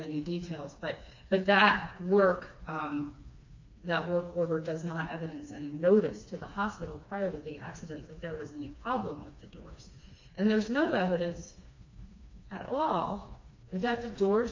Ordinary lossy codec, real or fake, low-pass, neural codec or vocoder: MP3, 48 kbps; fake; 7.2 kHz; codec, 16 kHz, 2 kbps, FreqCodec, smaller model